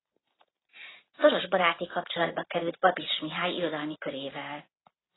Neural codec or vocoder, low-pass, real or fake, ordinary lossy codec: none; 7.2 kHz; real; AAC, 16 kbps